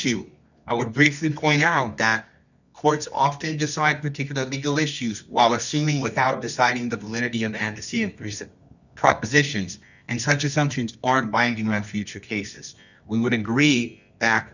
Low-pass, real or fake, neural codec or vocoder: 7.2 kHz; fake; codec, 24 kHz, 0.9 kbps, WavTokenizer, medium music audio release